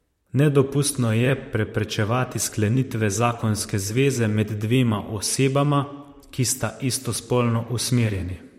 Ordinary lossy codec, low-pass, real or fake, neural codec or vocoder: MP3, 64 kbps; 19.8 kHz; fake; vocoder, 44.1 kHz, 128 mel bands, Pupu-Vocoder